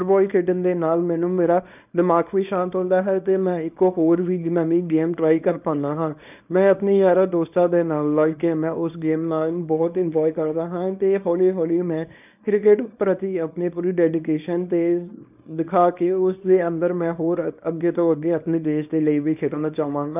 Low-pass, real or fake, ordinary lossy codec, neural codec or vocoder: 3.6 kHz; fake; none; codec, 24 kHz, 0.9 kbps, WavTokenizer, small release